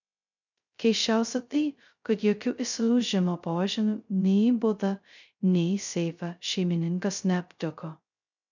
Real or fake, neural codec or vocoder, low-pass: fake; codec, 16 kHz, 0.2 kbps, FocalCodec; 7.2 kHz